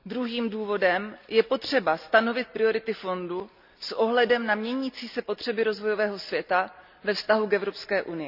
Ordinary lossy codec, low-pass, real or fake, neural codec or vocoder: none; 5.4 kHz; real; none